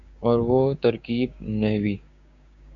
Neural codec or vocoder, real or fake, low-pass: codec, 16 kHz, 6 kbps, DAC; fake; 7.2 kHz